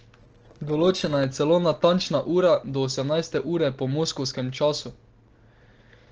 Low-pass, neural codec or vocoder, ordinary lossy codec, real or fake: 7.2 kHz; none; Opus, 16 kbps; real